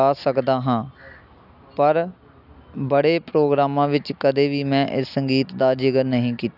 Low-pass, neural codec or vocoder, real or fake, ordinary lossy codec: 5.4 kHz; none; real; none